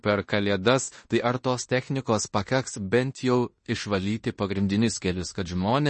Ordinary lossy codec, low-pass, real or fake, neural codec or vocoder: MP3, 32 kbps; 10.8 kHz; fake; codec, 16 kHz in and 24 kHz out, 0.9 kbps, LongCat-Audio-Codec, fine tuned four codebook decoder